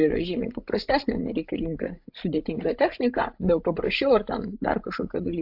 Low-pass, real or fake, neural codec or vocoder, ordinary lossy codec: 5.4 kHz; fake; codec, 16 kHz, 16 kbps, FreqCodec, larger model; AAC, 48 kbps